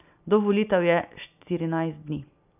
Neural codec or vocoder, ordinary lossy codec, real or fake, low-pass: none; none; real; 3.6 kHz